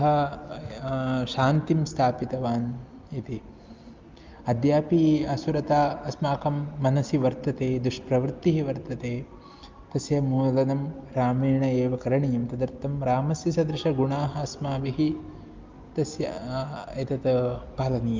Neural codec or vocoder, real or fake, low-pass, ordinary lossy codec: none; real; 7.2 kHz; Opus, 24 kbps